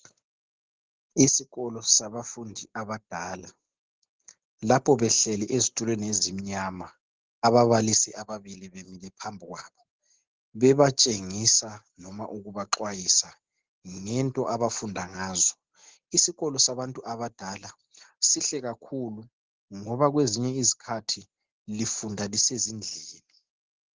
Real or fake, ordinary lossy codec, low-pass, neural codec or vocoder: real; Opus, 16 kbps; 7.2 kHz; none